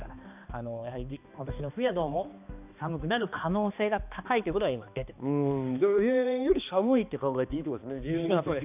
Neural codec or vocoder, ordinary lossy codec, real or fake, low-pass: codec, 16 kHz, 2 kbps, X-Codec, HuBERT features, trained on balanced general audio; none; fake; 3.6 kHz